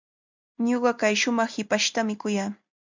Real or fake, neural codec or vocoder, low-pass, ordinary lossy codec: real; none; 7.2 kHz; MP3, 64 kbps